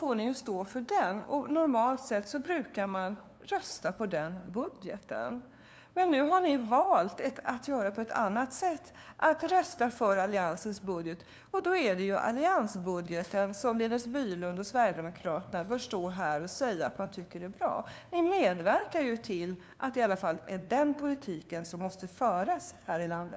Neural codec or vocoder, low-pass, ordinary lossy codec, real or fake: codec, 16 kHz, 2 kbps, FunCodec, trained on LibriTTS, 25 frames a second; none; none; fake